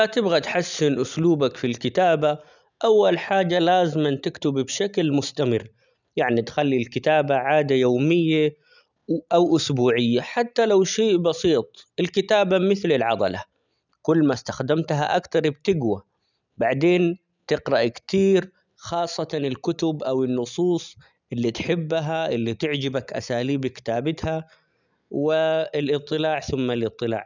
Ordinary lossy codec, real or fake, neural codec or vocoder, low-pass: none; real; none; 7.2 kHz